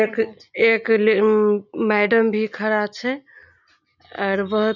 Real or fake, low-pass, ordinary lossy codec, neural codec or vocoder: real; 7.2 kHz; none; none